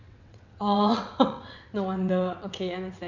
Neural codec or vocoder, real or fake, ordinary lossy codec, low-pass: vocoder, 22.05 kHz, 80 mel bands, WaveNeXt; fake; none; 7.2 kHz